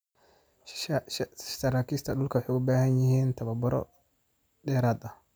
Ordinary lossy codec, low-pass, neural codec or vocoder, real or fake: none; none; none; real